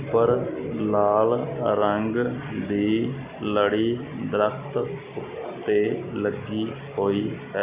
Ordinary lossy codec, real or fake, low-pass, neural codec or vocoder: Opus, 64 kbps; real; 3.6 kHz; none